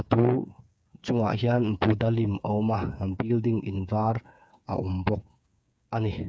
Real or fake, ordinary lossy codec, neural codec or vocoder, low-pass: fake; none; codec, 16 kHz, 8 kbps, FreqCodec, smaller model; none